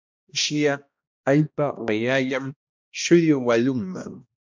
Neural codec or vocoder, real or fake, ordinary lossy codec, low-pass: codec, 16 kHz, 1 kbps, X-Codec, HuBERT features, trained on balanced general audio; fake; MP3, 64 kbps; 7.2 kHz